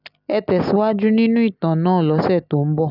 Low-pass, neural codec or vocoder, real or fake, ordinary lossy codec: 5.4 kHz; none; real; none